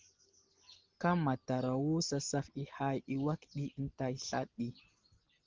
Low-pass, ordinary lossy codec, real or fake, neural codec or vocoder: 7.2 kHz; Opus, 16 kbps; real; none